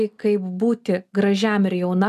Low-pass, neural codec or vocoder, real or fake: 14.4 kHz; none; real